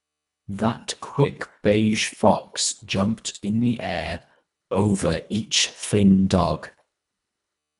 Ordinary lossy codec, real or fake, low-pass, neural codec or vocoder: none; fake; 10.8 kHz; codec, 24 kHz, 1.5 kbps, HILCodec